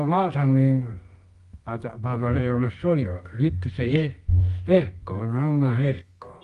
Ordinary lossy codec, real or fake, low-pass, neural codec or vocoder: Opus, 32 kbps; fake; 10.8 kHz; codec, 24 kHz, 0.9 kbps, WavTokenizer, medium music audio release